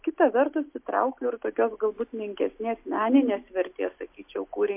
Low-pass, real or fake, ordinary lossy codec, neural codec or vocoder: 3.6 kHz; real; MP3, 32 kbps; none